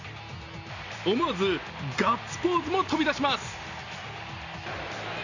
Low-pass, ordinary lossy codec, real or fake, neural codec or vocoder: 7.2 kHz; none; real; none